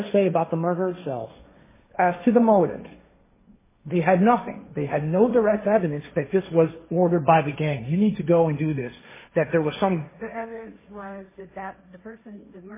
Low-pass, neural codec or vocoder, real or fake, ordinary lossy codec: 3.6 kHz; codec, 16 kHz, 1.1 kbps, Voila-Tokenizer; fake; MP3, 16 kbps